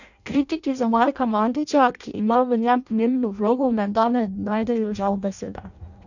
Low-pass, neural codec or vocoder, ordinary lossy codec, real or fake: 7.2 kHz; codec, 16 kHz in and 24 kHz out, 0.6 kbps, FireRedTTS-2 codec; none; fake